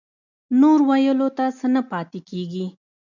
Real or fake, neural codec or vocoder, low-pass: real; none; 7.2 kHz